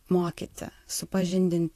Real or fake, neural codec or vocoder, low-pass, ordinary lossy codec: fake; vocoder, 44.1 kHz, 128 mel bands, Pupu-Vocoder; 14.4 kHz; AAC, 64 kbps